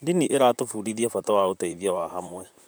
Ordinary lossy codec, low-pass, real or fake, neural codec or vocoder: none; none; fake; vocoder, 44.1 kHz, 128 mel bands, Pupu-Vocoder